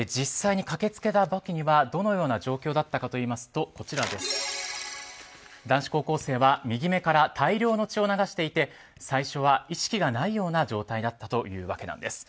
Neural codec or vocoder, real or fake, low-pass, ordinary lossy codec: none; real; none; none